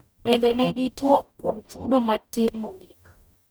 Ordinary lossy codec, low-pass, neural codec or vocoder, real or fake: none; none; codec, 44.1 kHz, 0.9 kbps, DAC; fake